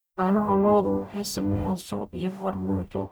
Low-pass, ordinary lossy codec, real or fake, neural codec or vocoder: none; none; fake; codec, 44.1 kHz, 0.9 kbps, DAC